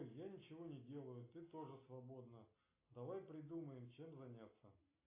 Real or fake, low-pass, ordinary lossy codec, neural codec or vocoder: real; 3.6 kHz; AAC, 24 kbps; none